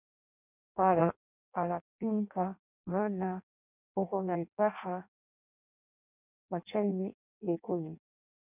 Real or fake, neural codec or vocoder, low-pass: fake; codec, 16 kHz in and 24 kHz out, 0.6 kbps, FireRedTTS-2 codec; 3.6 kHz